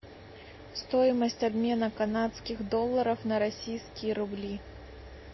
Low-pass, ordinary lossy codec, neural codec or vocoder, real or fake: 7.2 kHz; MP3, 24 kbps; none; real